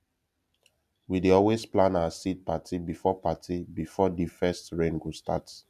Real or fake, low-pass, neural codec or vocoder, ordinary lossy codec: real; 14.4 kHz; none; none